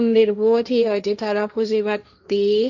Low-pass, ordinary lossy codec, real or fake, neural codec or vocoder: 7.2 kHz; none; fake; codec, 16 kHz, 1.1 kbps, Voila-Tokenizer